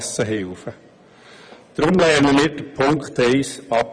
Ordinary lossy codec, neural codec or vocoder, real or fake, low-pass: none; vocoder, 24 kHz, 100 mel bands, Vocos; fake; 9.9 kHz